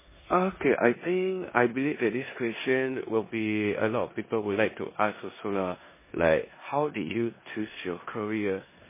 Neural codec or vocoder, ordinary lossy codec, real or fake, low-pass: codec, 16 kHz in and 24 kHz out, 0.9 kbps, LongCat-Audio-Codec, four codebook decoder; MP3, 16 kbps; fake; 3.6 kHz